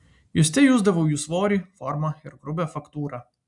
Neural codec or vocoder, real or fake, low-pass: none; real; 10.8 kHz